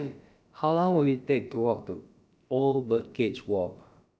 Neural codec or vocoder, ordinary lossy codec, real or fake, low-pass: codec, 16 kHz, about 1 kbps, DyCAST, with the encoder's durations; none; fake; none